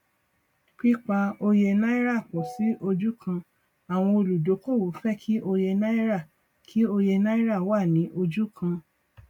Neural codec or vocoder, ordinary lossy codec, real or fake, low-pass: none; MP3, 96 kbps; real; 19.8 kHz